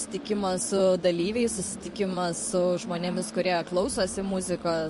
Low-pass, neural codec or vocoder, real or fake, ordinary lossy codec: 14.4 kHz; vocoder, 44.1 kHz, 128 mel bands, Pupu-Vocoder; fake; MP3, 48 kbps